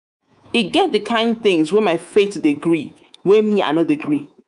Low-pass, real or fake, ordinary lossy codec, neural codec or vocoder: 10.8 kHz; fake; none; codec, 24 kHz, 3.1 kbps, DualCodec